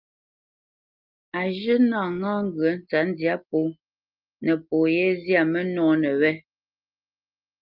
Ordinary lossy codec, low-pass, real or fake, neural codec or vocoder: Opus, 24 kbps; 5.4 kHz; real; none